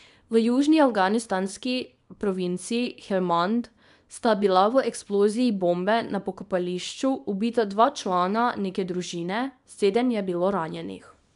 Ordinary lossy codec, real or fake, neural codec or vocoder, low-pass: none; fake; codec, 24 kHz, 0.9 kbps, WavTokenizer, small release; 10.8 kHz